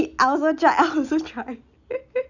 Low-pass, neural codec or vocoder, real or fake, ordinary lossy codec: 7.2 kHz; none; real; none